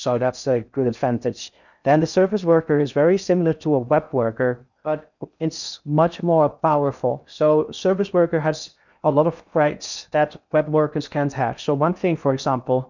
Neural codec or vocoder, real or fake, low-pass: codec, 16 kHz in and 24 kHz out, 0.6 kbps, FocalCodec, streaming, 4096 codes; fake; 7.2 kHz